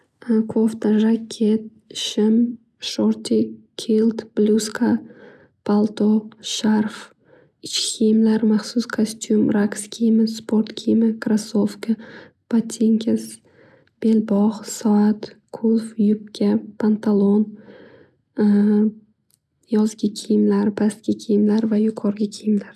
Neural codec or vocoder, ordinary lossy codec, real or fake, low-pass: none; none; real; none